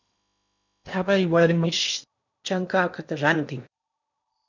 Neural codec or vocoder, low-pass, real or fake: codec, 16 kHz in and 24 kHz out, 0.8 kbps, FocalCodec, streaming, 65536 codes; 7.2 kHz; fake